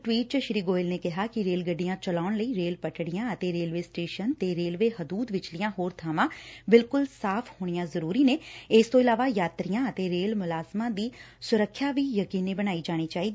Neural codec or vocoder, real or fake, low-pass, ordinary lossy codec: none; real; none; none